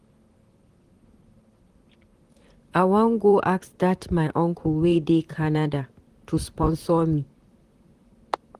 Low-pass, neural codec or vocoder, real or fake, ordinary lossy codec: 14.4 kHz; vocoder, 44.1 kHz, 128 mel bands, Pupu-Vocoder; fake; Opus, 24 kbps